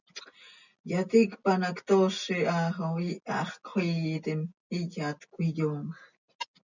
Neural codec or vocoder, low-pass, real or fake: none; 7.2 kHz; real